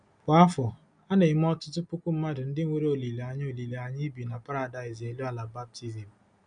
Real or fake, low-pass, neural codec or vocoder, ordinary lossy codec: real; 9.9 kHz; none; none